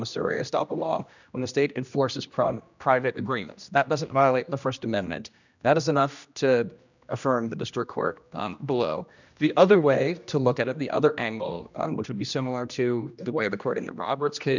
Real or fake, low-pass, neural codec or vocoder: fake; 7.2 kHz; codec, 16 kHz, 1 kbps, X-Codec, HuBERT features, trained on general audio